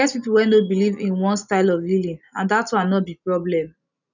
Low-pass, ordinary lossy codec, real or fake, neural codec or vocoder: 7.2 kHz; none; real; none